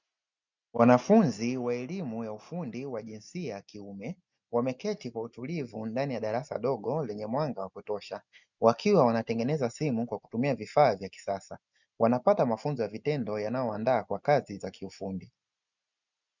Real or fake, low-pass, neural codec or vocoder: real; 7.2 kHz; none